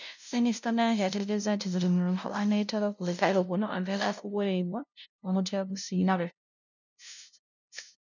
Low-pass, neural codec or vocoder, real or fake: 7.2 kHz; codec, 16 kHz, 0.5 kbps, FunCodec, trained on LibriTTS, 25 frames a second; fake